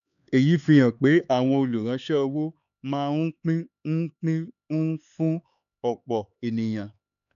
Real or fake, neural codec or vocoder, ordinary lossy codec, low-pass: fake; codec, 16 kHz, 4 kbps, X-Codec, HuBERT features, trained on LibriSpeech; none; 7.2 kHz